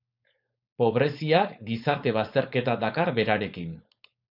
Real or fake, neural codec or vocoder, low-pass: fake; codec, 16 kHz, 4.8 kbps, FACodec; 5.4 kHz